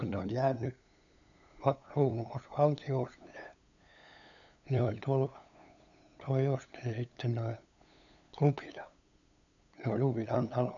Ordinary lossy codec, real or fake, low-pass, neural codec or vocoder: none; fake; 7.2 kHz; codec, 16 kHz, 8 kbps, FunCodec, trained on LibriTTS, 25 frames a second